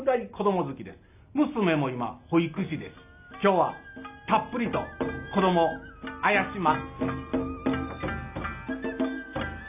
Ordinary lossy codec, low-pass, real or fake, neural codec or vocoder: AAC, 32 kbps; 3.6 kHz; real; none